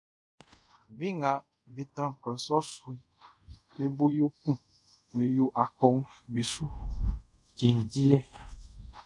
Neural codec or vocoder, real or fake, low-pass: codec, 24 kHz, 0.5 kbps, DualCodec; fake; 10.8 kHz